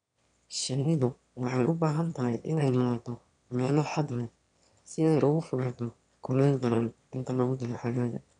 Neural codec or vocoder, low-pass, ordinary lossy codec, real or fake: autoencoder, 22.05 kHz, a latent of 192 numbers a frame, VITS, trained on one speaker; 9.9 kHz; none; fake